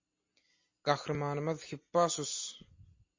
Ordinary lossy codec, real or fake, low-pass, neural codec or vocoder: MP3, 32 kbps; real; 7.2 kHz; none